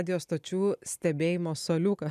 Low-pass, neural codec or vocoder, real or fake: 14.4 kHz; none; real